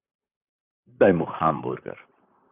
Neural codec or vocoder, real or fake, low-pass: vocoder, 44.1 kHz, 128 mel bands, Pupu-Vocoder; fake; 3.6 kHz